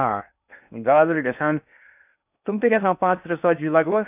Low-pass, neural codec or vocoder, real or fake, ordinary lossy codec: 3.6 kHz; codec, 16 kHz in and 24 kHz out, 0.6 kbps, FocalCodec, streaming, 4096 codes; fake; none